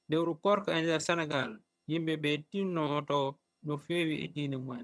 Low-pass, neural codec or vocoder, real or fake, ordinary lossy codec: none; vocoder, 22.05 kHz, 80 mel bands, HiFi-GAN; fake; none